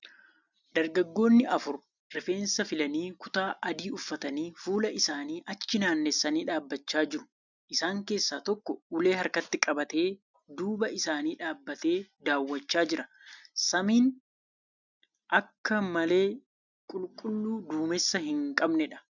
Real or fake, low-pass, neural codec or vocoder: real; 7.2 kHz; none